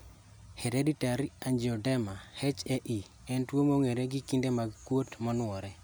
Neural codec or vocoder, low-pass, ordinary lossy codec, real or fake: none; none; none; real